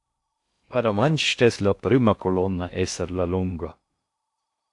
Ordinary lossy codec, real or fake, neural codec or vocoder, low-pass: AAC, 64 kbps; fake; codec, 16 kHz in and 24 kHz out, 0.6 kbps, FocalCodec, streaming, 2048 codes; 10.8 kHz